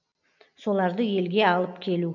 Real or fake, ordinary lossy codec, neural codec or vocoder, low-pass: real; none; none; 7.2 kHz